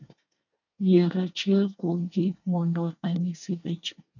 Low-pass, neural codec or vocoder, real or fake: 7.2 kHz; codec, 24 kHz, 1 kbps, SNAC; fake